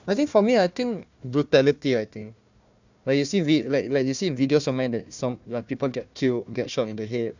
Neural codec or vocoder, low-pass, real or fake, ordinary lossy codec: codec, 16 kHz, 1 kbps, FunCodec, trained on Chinese and English, 50 frames a second; 7.2 kHz; fake; none